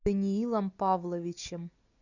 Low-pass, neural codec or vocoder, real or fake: 7.2 kHz; none; real